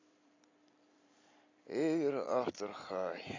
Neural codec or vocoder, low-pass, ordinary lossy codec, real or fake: vocoder, 44.1 kHz, 128 mel bands every 256 samples, BigVGAN v2; 7.2 kHz; AAC, 32 kbps; fake